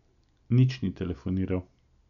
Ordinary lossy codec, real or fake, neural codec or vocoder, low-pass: none; real; none; 7.2 kHz